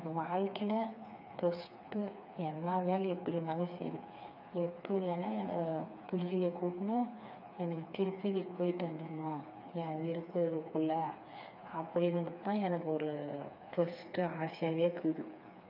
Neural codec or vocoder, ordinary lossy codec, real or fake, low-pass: codec, 16 kHz, 4 kbps, FreqCodec, smaller model; none; fake; 5.4 kHz